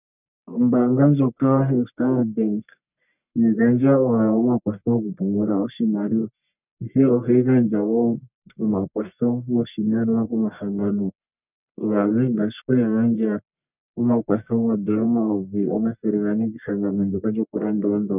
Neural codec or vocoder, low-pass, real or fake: codec, 44.1 kHz, 1.7 kbps, Pupu-Codec; 3.6 kHz; fake